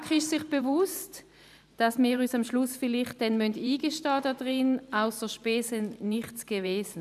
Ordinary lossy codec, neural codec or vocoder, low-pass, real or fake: none; vocoder, 44.1 kHz, 128 mel bands every 512 samples, BigVGAN v2; 14.4 kHz; fake